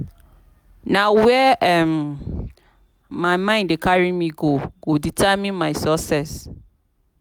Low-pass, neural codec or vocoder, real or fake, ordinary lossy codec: none; none; real; none